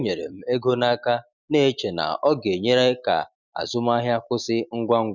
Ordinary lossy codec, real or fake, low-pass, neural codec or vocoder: none; real; 7.2 kHz; none